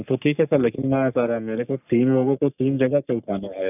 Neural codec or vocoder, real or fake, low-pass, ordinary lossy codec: codec, 44.1 kHz, 3.4 kbps, Pupu-Codec; fake; 3.6 kHz; none